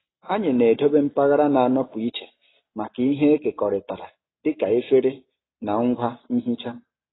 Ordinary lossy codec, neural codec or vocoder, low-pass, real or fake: AAC, 16 kbps; none; 7.2 kHz; real